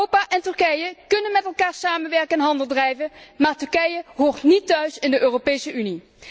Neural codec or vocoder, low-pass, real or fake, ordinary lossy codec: none; none; real; none